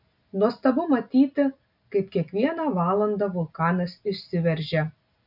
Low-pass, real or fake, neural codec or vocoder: 5.4 kHz; real; none